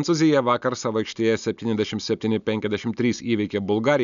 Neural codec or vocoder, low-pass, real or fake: none; 7.2 kHz; real